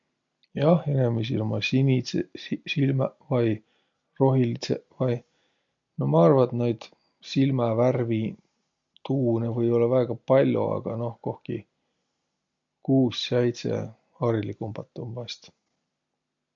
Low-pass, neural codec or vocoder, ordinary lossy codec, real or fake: 7.2 kHz; none; MP3, 48 kbps; real